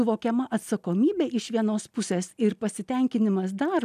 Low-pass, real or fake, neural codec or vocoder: 14.4 kHz; real; none